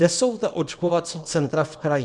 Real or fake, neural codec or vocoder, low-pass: fake; codec, 24 kHz, 0.9 kbps, WavTokenizer, small release; 10.8 kHz